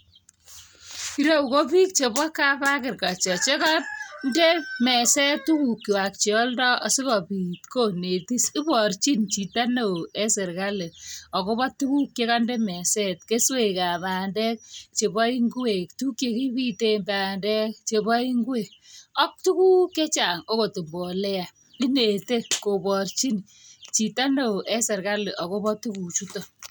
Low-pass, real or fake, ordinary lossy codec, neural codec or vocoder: none; real; none; none